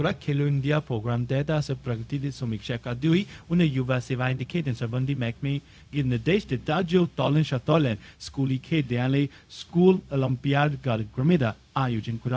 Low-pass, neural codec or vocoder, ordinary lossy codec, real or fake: none; codec, 16 kHz, 0.4 kbps, LongCat-Audio-Codec; none; fake